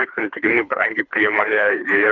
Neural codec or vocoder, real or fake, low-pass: codec, 24 kHz, 3 kbps, HILCodec; fake; 7.2 kHz